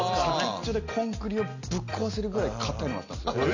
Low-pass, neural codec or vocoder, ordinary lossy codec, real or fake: 7.2 kHz; none; none; real